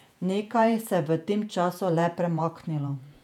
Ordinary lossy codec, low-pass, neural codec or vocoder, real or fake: none; 19.8 kHz; vocoder, 48 kHz, 128 mel bands, Vocos; fake